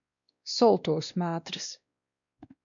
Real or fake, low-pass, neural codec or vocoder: fake; 7.2 kHz; codec, 16 kHz, 1 kbps, X-Codec, WavLM features, trained on Multilingual LibriSpeech